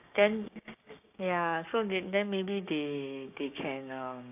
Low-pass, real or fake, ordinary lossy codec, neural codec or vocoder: 3.6 kHz; fake; AAC, 32 kbps; codec, 16 kHz, 6 kbps, DAC